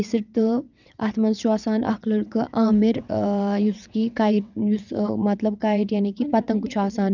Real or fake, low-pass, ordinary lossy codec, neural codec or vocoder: fake; 7.2 kHz; none; vocoder, 22.05 kHz, 80 mel bands, WaveNeXt